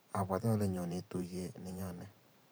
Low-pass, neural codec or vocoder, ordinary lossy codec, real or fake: none; vocoder, 44.1 kHz, 128 mel bands every 512 samples, BigVGAN v2; none; fake